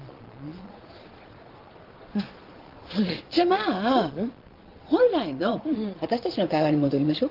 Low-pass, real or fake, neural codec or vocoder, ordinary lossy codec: 5.4 kHz; fake; vocoder, 22.05 kHz, 80 mel bands, Vocos; Opus, 16 kbps